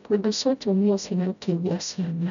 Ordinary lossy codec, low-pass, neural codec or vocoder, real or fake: none; 7.2 kHz; codec, 16 kHz, 0.5 kbps, FreqCodec, smaller model; fake